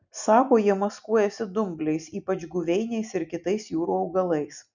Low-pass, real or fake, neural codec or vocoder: 7.2 kHz; real; none